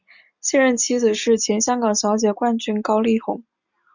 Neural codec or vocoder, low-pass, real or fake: none; 7.2 kHz; real